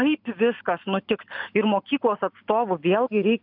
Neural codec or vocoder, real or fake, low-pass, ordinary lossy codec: none; real; 5.4 kHz; AAC, 48 kbps